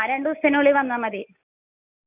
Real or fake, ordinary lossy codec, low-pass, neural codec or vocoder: real; none; 3.6 kHz; none